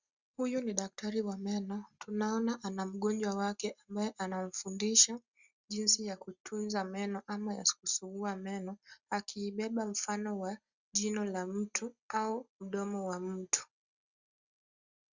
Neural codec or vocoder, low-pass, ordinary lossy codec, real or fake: none; 7.2 kHz; Opus, 64 kbps; real